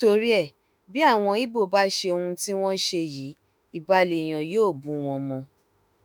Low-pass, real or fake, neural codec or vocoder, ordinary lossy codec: none; fake; autoencoder, 48 kHz, 32 numbers a frame, DAC-VAE, trained on Japanese speech; none